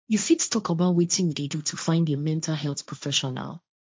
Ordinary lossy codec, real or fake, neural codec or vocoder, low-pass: none; fake; codec, 16 kHz, 1.1 kbps, Voila-Tokenizer; none